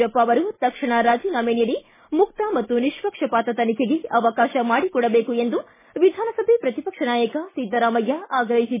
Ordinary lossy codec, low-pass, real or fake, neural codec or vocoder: MP3, 16 kbps; 3.6 kHz; real; none